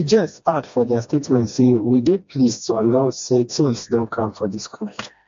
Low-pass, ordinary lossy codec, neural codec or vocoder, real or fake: 7.2 kHz; MP3, 48 kbps; codec, 16 kHz, 1 kbps, FreqCodec, smaller model; fake